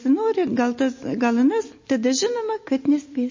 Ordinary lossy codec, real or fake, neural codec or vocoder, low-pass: MP3, 32 kbps; real; none; 7.2 kHz